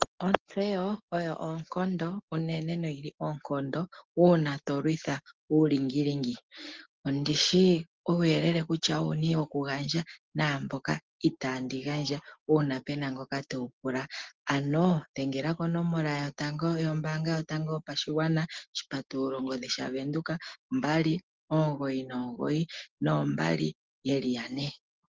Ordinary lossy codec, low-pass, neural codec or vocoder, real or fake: Opus, 16 kbps; 7.2 kHz; none; real